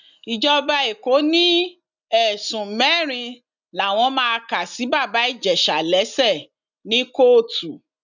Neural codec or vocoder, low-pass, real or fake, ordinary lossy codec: none; 7.2 kHz; real; none